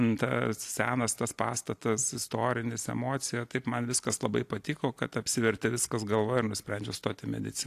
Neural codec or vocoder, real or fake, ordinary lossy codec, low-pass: none; real; AAC, 64 kbps; 14.4 kHz